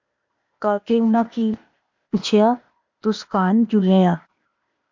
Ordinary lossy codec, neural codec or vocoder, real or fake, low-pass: MP3, 48 kbps; codec, 16 kHz, 0.8 kbps, ZipCodec; fake; 7.2 kHz